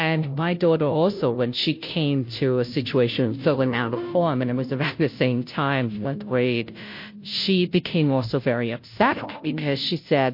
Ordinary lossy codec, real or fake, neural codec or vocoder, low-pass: MP3, 32 kbps; fake; codec, 16 kHz, 0.5 kbps, FunCodec, trained on Chinese and English, 25 frames a second; 5.4 kHz